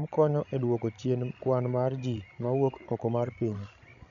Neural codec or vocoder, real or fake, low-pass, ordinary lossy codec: codec, 16 kHz, 16 kbps, FreqCodec, larger model; fake; 7.2 kHz; none